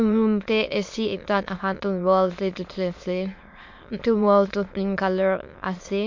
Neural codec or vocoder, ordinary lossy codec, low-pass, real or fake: autoencoder, 22.05 kHz, a latent of 192 numbers a frame, VITS, trained on many speakers; MP3, 48 kbps; 7.2 kHz; fake